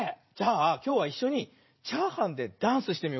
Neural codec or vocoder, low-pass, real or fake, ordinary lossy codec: vocoder, 44.1 kHz, 80 mel bands, Vocos; 7.2 kHz; fake; MP3, 24 kbps